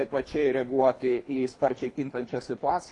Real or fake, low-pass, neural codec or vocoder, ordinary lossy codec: fake; 10.8 kHz; codec, 24 kHz, 3 kbps, HILCodec; AAC, 48 kbps